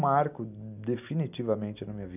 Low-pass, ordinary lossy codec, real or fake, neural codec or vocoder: 3.6 kHz; none; real; none